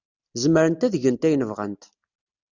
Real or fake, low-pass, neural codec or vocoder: real; 7.2 kHz; none